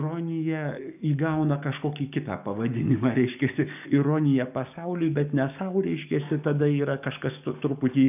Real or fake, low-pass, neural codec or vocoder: fake; 3.6 kHz; codec, 44.1 kHz, 7.8 kbps, DAC